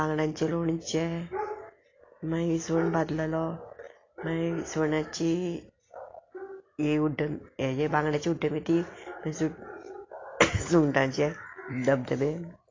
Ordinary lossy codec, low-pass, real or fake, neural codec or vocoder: AAC, 32 kbps; 7.2 kHz; real; none